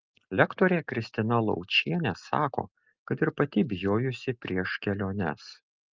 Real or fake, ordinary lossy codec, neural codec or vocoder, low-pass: real; Opus, 24 kbps; none; 7.2 kHz